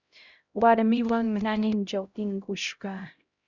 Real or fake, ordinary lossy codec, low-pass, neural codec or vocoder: fake; Opus, 64 kbps; 7.2 kHz; codec, 16 kHz, 0.5 kbps, X-Codec, HuBERT features, trained on LibriSpeech